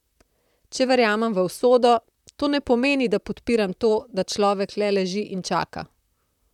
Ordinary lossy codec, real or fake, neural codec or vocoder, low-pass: none; fake; vocoder, 44.1 kHz, 128 mel bands, Pupu-Vocoder; 19.8 kHz